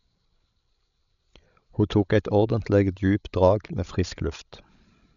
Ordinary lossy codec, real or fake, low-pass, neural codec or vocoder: none; fake; 7.2 kHz; codec, 16 kHz, 16 kbps, FreqCodec, larger model